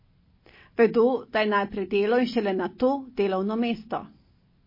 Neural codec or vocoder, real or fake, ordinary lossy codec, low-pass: none; real; MP3, 24 kbps; 5.4 kHz